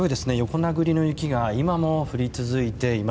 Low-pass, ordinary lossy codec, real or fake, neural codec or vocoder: none; none; real; none